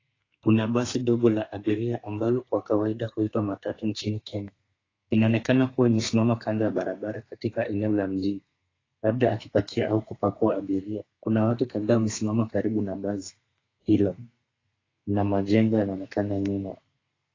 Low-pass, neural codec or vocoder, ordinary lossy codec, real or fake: 7.2 kHz; codec, 32 kHz, 1.9 kbps, SNAC; AAC, 32 kbps; fake